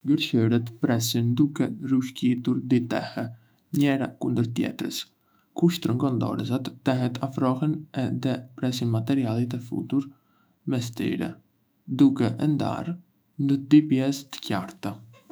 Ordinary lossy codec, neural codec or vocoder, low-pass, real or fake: none; autoencoder, 48 kHz, 128 numbers a frame, DAC-VAE, trained on Japanese speech; none; fake